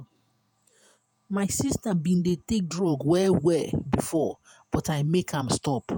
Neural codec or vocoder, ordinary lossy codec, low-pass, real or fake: vocoder, 48 kHz, 128 mel bands, Vocos; none; none; fake